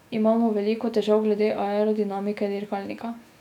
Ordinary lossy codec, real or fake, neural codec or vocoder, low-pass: none; fake; autoencoder, 48 kHz, 128 numbers a frame, DAC-VAE, trained on Japanese speech; 19.8 kHz